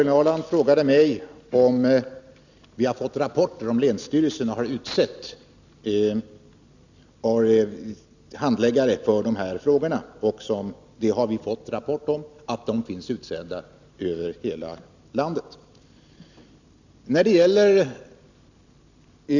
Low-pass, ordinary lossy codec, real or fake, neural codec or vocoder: 7.2 kHz; none; real; none